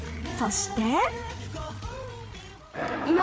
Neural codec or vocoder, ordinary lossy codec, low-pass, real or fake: codec, 16 kHz, 8 kbps, FreqCodec, larger model; none; none; fake